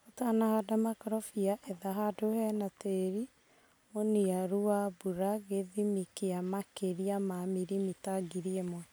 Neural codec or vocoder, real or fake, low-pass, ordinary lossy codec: none; real; none; none